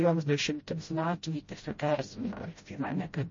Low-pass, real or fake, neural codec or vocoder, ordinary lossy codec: 7.2 kHz; fake; codec, 16 kHz, 0.5 kbps, FreqCodec, smaller model; MP3, 32 kbps